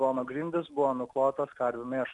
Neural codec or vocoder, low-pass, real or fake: none; 10.8 kHz; real